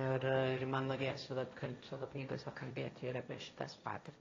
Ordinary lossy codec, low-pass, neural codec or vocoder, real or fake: AAC, 32 kbps; 7.2 kHz; codec, 16 kHz, 1.1 kbps, Voila-Tokenizer; fake